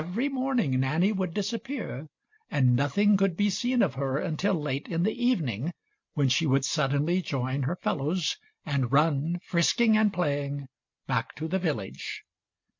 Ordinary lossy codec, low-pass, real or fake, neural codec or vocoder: MP3, 48 kbps; 7.2 kHz; real; none